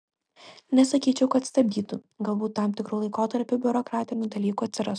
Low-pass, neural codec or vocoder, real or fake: 9.9 kHz; none; real